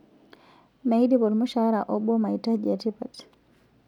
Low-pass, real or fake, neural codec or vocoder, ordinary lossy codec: 19.8 kHz; real; none; none